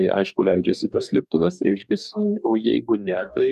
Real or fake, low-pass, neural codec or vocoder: fake; 14.4 kHz; codec, 44.1 kHz, 2.6 kbps, DAC